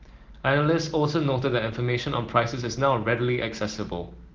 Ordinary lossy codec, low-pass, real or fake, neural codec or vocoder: Opus, 16 kbps; 7.2 kHz; real; none